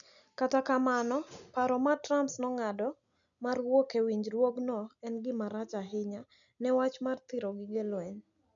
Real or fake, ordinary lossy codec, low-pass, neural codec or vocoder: real; none; 7.2 kHz; none